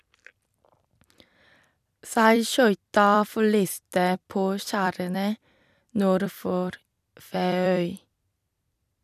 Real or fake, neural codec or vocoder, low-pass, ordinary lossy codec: fake; vocoder, 44.1 kHz, 128 mel bands every 256 samples, BigVGAN v2; 14.4 kHz; none